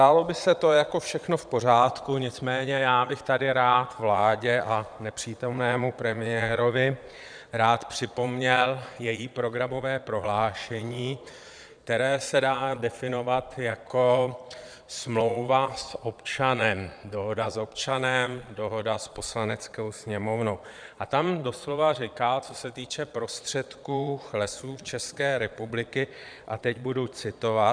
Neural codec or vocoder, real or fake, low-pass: vocoder, 22.05 kHz, 80 mel bands, Vocos; fake; 9.9 kHz